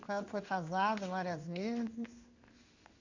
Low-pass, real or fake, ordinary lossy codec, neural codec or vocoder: 7.2 kHz; fake; Opus, 64 kbps; codec, 16 kHz in and 24 kHz out, 1 kbps, XY-Tokenizer